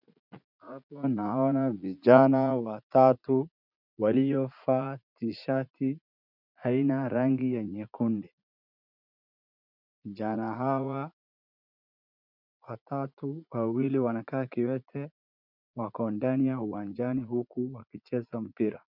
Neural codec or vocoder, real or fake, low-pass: vocoder, 44.1 kHz, 80 mel bands, Vocos; fake; 5.4 kHz